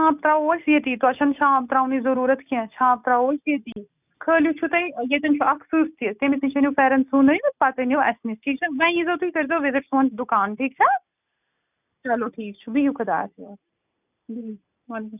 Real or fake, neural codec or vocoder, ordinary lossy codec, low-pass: real; none; none; 3.6 kHz